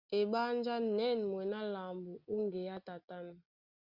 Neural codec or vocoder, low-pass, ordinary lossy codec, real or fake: none; 5.4 kHz; Opus, 64 kbps; real